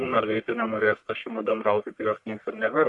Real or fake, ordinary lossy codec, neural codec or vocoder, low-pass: fake; MP3, 64 kbps; codec, 44.1 kHz, 1.7 kbps, Pupu-Codec; 10.8 kHz